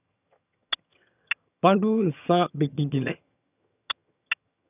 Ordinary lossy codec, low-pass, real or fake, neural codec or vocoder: none; 3.6 kHz; fake; vocoder, 22.05 kHz, 80 mel bands, HiFi-GAN